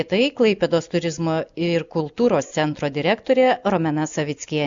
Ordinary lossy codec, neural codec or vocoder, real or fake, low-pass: Opus, 64 kbps; none; real; 7.2 kHz